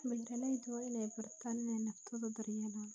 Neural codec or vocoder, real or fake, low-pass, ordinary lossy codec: none; real; none; none